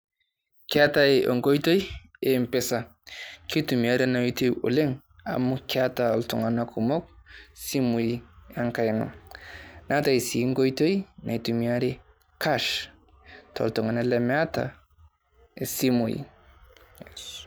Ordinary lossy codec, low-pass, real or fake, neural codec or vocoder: none; none; real; none